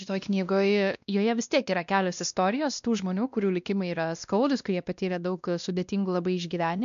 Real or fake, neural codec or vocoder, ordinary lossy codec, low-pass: fake; codec, 16 kHz, 1 kbps, X-Codec, WavLM features, trained on Multilingual LibriSpeech; MP3, 96 kbps; 7.2 kHz